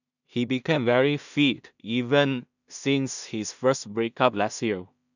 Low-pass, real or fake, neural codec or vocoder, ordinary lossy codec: 7.2 kHz; fake; codec, 16 kHz in and 24 kHz out, 0.4 kbps, LongCat-Audio-Codec, two codebook decoder; none